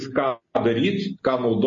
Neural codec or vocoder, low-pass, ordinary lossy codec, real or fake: none; 7.2 kHz; MP3, 32 kbps; real